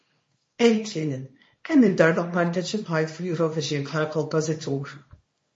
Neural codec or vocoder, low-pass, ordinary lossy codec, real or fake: codec, 24 kHz, 0.9 kbps, WavTokenizer, small release; 10.8 kHz; MP3, 32 kbps; fake